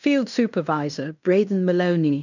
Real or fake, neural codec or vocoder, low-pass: fake; codec, 16 kHz in and 24 kHz out, 0.9 kbps, LongCat-Audio-Codec, fine tuned four codebook decoder; 7.2 kHz